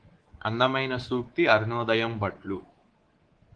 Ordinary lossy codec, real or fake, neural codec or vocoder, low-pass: Opus, 16 kbps; fake; codec, 24 kHz, 3.1 kbps, DualCodec; 9.9 kHz